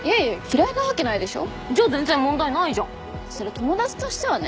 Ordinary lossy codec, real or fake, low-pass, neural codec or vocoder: none; real; none; none